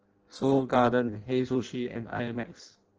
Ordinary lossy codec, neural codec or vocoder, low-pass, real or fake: Opus, 16 kbps; codec, 16 kHz in and 24 kHz out, 0.6 kbps, FireRedTTS-2 codec; 7.2 kHz; fake